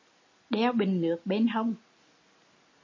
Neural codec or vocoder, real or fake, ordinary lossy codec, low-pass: none; real; MP3, 32 kbps; 7.2 kHz